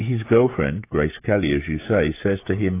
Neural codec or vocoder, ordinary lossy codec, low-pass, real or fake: codec, 16 kHz, 16 kbps, FreqCodec, smaller model; AAC, 24 kbps; 3.6 kHz; fake